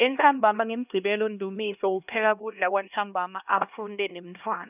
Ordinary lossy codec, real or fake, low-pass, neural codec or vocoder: none; fake; 3.6 kHz; codec, 16 kHz, 1 kbps, X-Codec, HuBERT features, trained on LibriSpeech